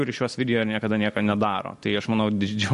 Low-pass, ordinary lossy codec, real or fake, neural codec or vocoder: 14.4 kHz; MP3, 48 kbps; real; none